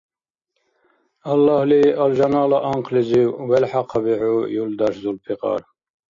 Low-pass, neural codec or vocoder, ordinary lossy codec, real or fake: 7.2 kHz; none; AAC, 48 kbps; real